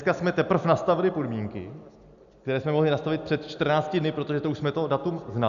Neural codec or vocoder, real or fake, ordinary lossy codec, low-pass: none; real; MP3, 96 kbps; 7.2 kHz